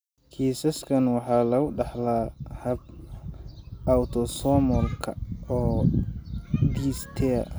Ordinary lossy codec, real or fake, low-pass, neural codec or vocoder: none; real; none; none